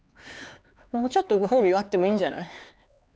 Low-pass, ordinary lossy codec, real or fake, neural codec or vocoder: none; none; fake; codec, 16 kHz, 4 kbps, X-Codec, HuBERT features, trained on LibriSpeech